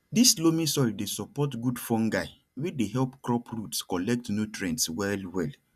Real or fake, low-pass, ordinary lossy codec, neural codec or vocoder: real; 14.4 kHz; none; none